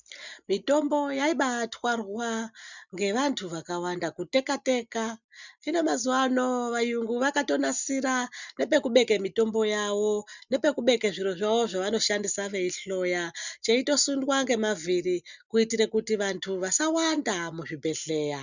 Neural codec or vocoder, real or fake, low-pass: none; real; 7.2 kHz